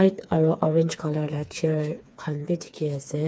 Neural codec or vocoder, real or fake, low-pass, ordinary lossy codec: codec, 16 kHz, 4 kbps, FreqCodec, smaller model; fake; none; none